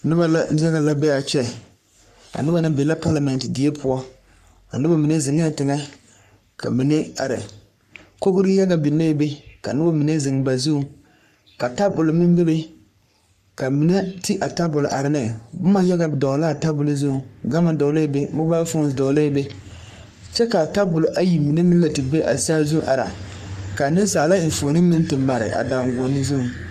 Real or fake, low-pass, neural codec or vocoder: fake; 14.4 kHz; codec, 44.1 kHz, 3.4 kbps, Pupu-Codec